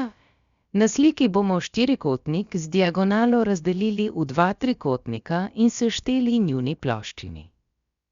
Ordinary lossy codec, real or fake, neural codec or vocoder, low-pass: Opus, 64 kbps; fake; codec, 16 kHz, about 1 kbps, DyCAST, with the encoder's durations; 7.2 kHz